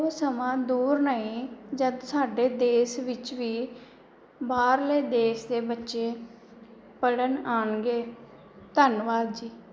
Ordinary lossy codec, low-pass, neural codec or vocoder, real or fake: none; none; none; real